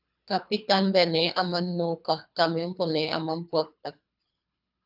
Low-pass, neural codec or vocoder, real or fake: 5.4 kHz; codec, 24 kHz, 3 kbps, HILCodec; fake